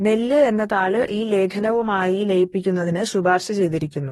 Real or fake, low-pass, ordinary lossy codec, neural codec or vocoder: fake; 19.8 kHz; AAC, 32 kbps; codec, 44.1 kHz, 2.6 kbps, DAC